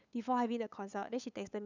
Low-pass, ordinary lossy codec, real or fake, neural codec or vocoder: 7.2 kHz; none; fake; codec, 16 kHz, 8 kbps, FunCodec, trained on LibriTTS, 25 frames a second